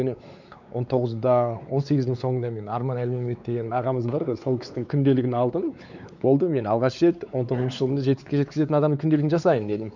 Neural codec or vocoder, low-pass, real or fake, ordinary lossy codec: codec, 16 kHz, 4 kbps, X-Codec, WavLM features, trained on Multilingual LibriSpeech; 7.2 kHz; fake; none